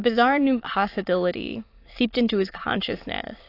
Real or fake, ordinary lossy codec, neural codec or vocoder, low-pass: fake; AAC, 32 kbps; autoencoder, 22.05 kHz, a latent of 192 numbers a frame, VITS, trained on many speakers; 5.4 kHz